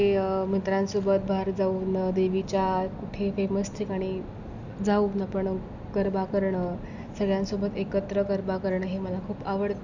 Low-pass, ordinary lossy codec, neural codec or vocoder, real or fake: 7.2 kHz; none; none; real